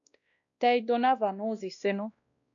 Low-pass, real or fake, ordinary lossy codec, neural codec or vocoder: 7.2 kHz; fake; MP3, 96 kbps; codec, 16 kHz, 1 kbps, X-Codec, WavLM features, trained on Multilingual LibriSpeech